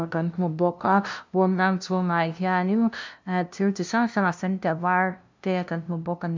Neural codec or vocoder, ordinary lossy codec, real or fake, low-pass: codec, 16 kHz, 0.5 kbps, FunCodec, trained on LibriTTS, 25 frames a second; MP3, 64 kbps; fake; 7.2 kHz